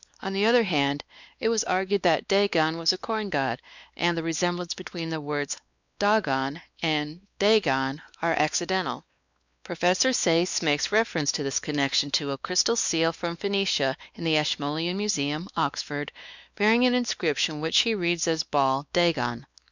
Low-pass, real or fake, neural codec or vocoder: 7.2 kHz; fake; codec, 16 kHz, 2 kbps, X-Codec, WavLM features, trained on Multilingual LibriSpeech